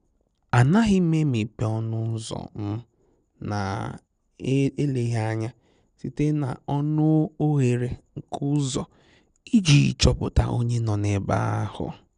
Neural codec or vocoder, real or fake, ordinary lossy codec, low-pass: none; real; none; 9.9 kHz